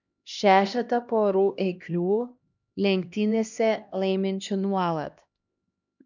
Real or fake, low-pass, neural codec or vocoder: fake; 7.2 kHz; codec, 16 kHz, 1 kbps, X-Codec, HuBERT features, trained on LibriSpeech